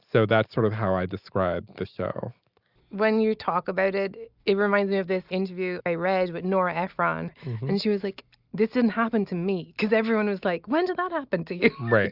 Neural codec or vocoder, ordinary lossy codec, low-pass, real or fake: none; Opus, 64 kbps; 5.4 kHz; real